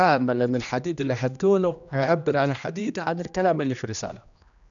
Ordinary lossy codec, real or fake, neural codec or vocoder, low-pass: none; fake; codec, 16 kHz, 1 kbps, X-Codec, HuBERT features, trained on general audio; 7.2 kHz